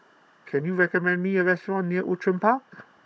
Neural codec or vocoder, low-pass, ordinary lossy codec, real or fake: codec, 16 kHz, 16 kbps, FunCodec, trained on Chinese and English, 50 frames a second; none; none; fake